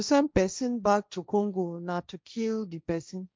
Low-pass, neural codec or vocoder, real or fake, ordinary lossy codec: none; codec, 16 kHz, 1.1 kbps, Voila-Tokenizer; fake; none